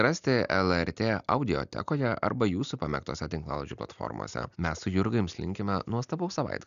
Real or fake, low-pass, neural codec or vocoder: real; 7.2 kHz; none